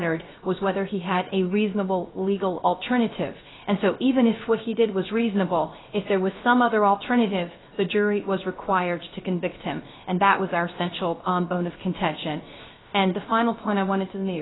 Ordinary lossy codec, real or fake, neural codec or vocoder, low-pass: AAC, 16 kbps; fake; codec, 16 kHz, 0.3 kbps, FocalCodec; 7.2 kHz